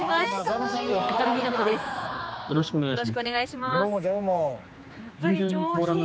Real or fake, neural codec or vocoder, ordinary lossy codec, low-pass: fake; codec, 16 kHz, 4 kbps, X-Codec, HuBERT features, trained on general audio; none; none